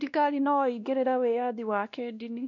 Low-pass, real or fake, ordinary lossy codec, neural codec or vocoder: 7.2 kHz; fake; none; codec, 16 kHz, 1 kbps, X-Codec, WavLM features, trained on Multilingual LibriSpeech